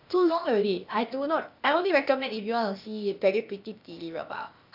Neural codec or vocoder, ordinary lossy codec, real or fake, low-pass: codec, 16 kHz, 0.8 kbps, ZipCodec; MP3, 48 kbps; fake; 5.4 kHz